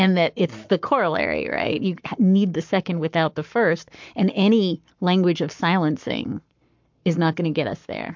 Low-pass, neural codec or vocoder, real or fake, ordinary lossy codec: 7.2 kHz; codec, 44.1 kHz, 7.8 kbps, Pupu-Codec; fake; MP3, 64 kbps